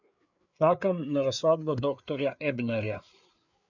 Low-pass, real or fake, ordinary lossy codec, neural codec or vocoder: 7.2 kHz; fake; none; codec, 16 kHz, 4 kbps, FreqCodec, larger model